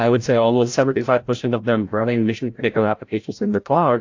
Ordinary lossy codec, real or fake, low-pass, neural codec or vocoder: AAC, 48 kbps; fake; 7.2 kHz; codec, 16 kHz, 0.5 kbps, FreqCodec, larger model